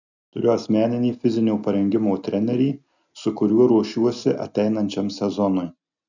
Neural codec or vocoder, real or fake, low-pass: none; real; 7.2 kHz